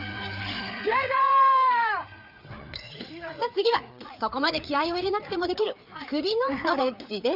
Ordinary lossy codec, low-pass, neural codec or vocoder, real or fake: none; 5.4 kHz; codec, 16 kHz, 4 kbps, FreqCodec, larger model; fake